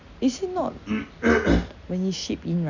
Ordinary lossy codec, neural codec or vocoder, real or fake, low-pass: none; none; real; 7.2 kHz